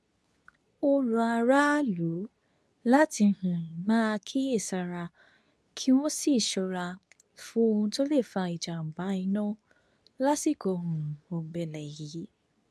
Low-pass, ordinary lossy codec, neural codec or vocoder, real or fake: none; none; codec, 24 kHz, 0.9 kbps, WavTokenizer, medium speech release version 2; fake